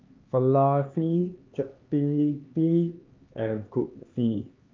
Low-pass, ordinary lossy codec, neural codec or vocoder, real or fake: 7.2 kHz; Opus, 32 kbps; codec, 16 kHz, 2 kbps, X-Codec, HuBERT features, trained on LibriSpeech; fake